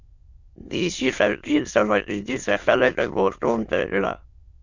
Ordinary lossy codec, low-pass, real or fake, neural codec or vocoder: Opus, 64 kbps; 7.2 kHz; fake; autoencoder, 22.05 kHz, a latent of 192 numbers a frame, VITS, trained on many speakers